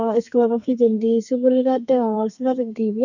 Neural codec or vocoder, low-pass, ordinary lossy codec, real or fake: codec, 32 kHz, 1.9 kbps, SNAC; 7.2 kHz; none; fake